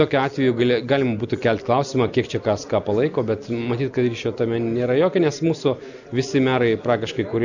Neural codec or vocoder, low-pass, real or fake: none; 7.2 kHz; real